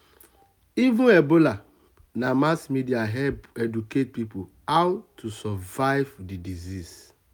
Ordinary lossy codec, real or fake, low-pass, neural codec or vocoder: none; real; none; none